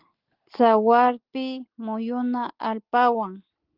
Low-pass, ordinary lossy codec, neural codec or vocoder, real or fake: 5.4 kHz; Opus, 16 kbps; none; real